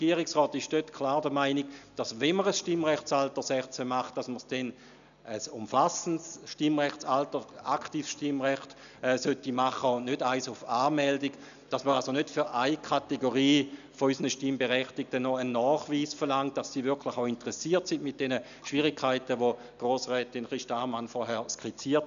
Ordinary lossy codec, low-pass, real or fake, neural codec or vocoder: none; 7.2 kHz; real; none